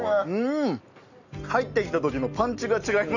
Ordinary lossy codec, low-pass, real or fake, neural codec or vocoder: none; 7.2 kHz; real; none